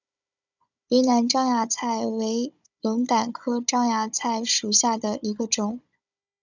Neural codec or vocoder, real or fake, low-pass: codec, 16 kHz, 16 kbps, FunCodec, trained on Chinese and English, 50 frames a second; fake; 7.2 kHz